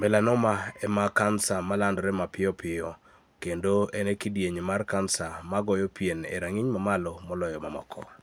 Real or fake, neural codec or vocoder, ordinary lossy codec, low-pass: real; none; none; none